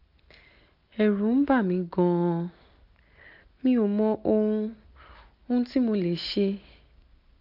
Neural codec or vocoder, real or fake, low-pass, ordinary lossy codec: none; real; 5.4 kHz; none